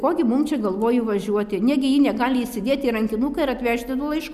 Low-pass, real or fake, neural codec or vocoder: 14.4 kHz; real; none